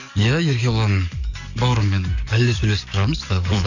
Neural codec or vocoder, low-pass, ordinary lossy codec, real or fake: codec, 44.1 kHz, 7.8 kbps, DAC; 7.2 kHz; none; fake